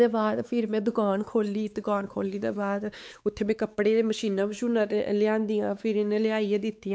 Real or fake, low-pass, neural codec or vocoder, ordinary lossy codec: fake; none; codec, 16 kHz, 4 kbps, X-Codec, WavLM features, trained on Multilingual LibriSpeech; none